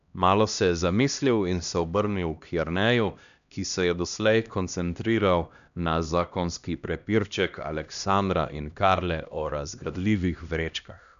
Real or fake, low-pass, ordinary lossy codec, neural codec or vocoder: fake; 7.2 kHz; none; codec, 16 kHz, 1 kbps, X-Codec, HuBERT features, trained on LibriSpeech